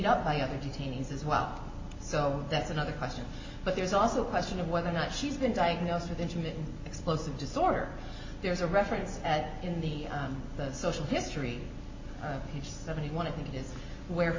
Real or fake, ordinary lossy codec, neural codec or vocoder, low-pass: real; MP3, 32 kbps; none; 7.2 kHz